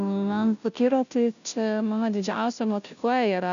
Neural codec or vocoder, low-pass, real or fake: codec, 16 kHz, 0.5 kbps, FunCodec, trained on Chinese and English, 25 frames a second; 7.2 kHz; fake